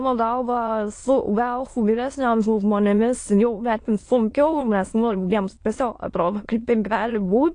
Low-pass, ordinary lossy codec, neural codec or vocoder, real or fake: 9.9 kHz; AAC, 48 kbps; autoencoder, 22.05 kHz, a latent of 192 numbers a frame, VITS, trained on many speakers; fake